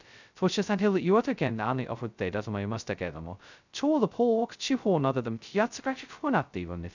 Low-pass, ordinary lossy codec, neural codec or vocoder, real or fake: 7.2 kHz; none; codec, 16 kHz, 0.2 kbps, FocalCodec; fake